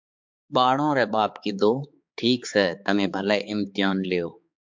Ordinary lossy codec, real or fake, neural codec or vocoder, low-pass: MP3, 64 kbps; fake; codec, 16 kHz, 4 kbps, X-Codec, HuBERT features, trained on balanced general audio; 7.2 kHz